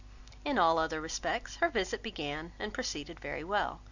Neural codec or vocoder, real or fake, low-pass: none; real; 7.2 kHz